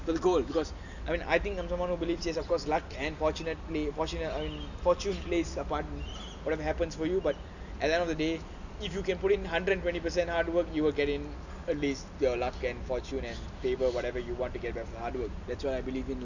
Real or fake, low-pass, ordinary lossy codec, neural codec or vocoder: real; 7.2 kHz; none; none